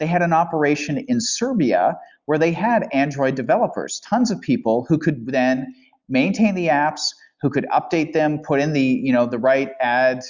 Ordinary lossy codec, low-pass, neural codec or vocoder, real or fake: Opus, 64 kbps; 7.2 kHz; none; real